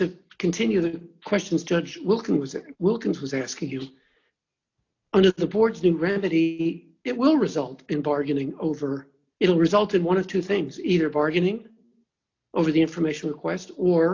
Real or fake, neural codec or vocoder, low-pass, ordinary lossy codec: real; none; 7.2 kHz; AAC, 48 kbps